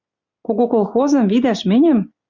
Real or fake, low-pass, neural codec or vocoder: real; 7.2 kHz; none